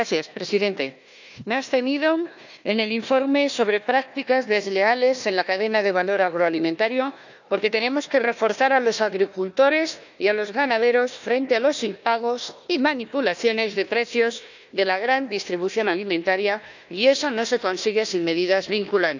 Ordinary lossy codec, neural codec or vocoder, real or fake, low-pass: none; codec, 16 kHz, 1 kbps, FunCodec, trained on Chinese and English, 50 frames a second; fake; 7.2 kHz